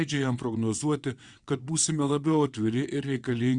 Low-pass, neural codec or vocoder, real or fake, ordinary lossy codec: 9.9 kHz; vocoder, 22.05 kHz, 80 mel bands, WaveNeXt; fake; Opus, 64 kbps